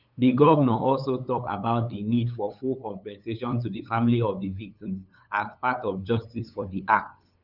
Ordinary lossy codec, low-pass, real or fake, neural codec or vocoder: none; 5.4 kHz; fake; codec, 16 kHz, 8 kbps, FunCodec, trained on LibriTTS, 25 frames a second